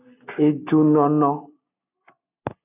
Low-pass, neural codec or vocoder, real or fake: 3.6 kHz; none; real